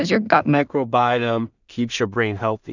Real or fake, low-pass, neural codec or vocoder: fake; 7.2 kHz; codec, 16 kHz in and 24 kHz out, 0.4 kbps, LongCat-Audio-Codec, two codebook decoder